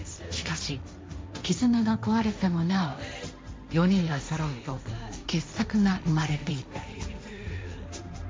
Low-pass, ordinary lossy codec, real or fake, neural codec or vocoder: none; none; fake; codec, 16 kHz, 1.1 kbps, Voila-Tokenizer